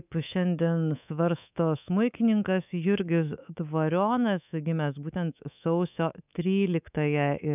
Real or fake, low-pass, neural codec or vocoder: fake; 3.6 kHz; codec, 24 kHz, 3.1 kbps, DualCodec